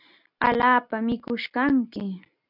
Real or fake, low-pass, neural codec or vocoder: real; 5.4 kHz; none